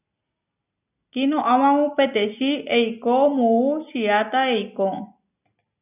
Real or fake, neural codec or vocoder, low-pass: real; none; 3.6 kHz